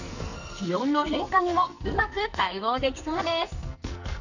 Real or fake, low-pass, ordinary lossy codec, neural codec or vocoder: fake; 7.2 kHz; none; codec, 44.1 kHz, 2.6 kbps, SNAC